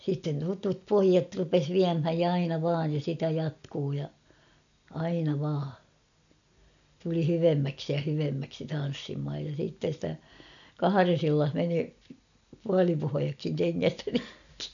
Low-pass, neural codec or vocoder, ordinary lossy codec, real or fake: 7.2 kHz; none; none; real